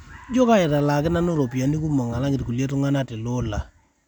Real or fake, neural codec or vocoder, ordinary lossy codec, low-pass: real; none; none; 19.8 kHz